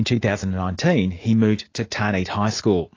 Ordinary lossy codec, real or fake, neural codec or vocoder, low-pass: AAC, 32 kbps; real; none; 7.2 kHz